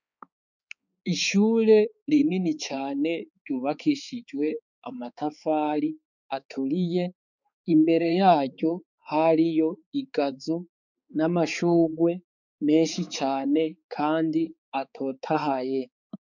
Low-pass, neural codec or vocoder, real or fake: 7.2 kHz; codec, 16 kHz, 4 kbps, X-Codec, HuBERT features, trained on balanced general audio; fake